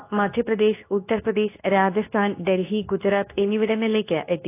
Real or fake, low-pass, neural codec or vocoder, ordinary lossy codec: fake; 3.6 kHz; codec, 24 kHz, 0.9 kbps, WavTokenizer, medium speech release version 2; AAC, 24 kbps